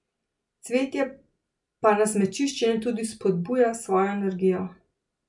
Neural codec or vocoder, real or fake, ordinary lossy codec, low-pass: none; real; MP3, 64 kbps; 10.8 kHz